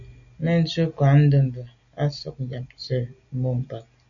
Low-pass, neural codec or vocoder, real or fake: 7.2 kHz; none; real